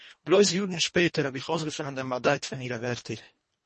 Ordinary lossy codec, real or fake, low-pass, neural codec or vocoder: MP3, 32 kbps; fake; 10.8 kHz; codec, 24 kHz, 1.5 kbps, HILCodec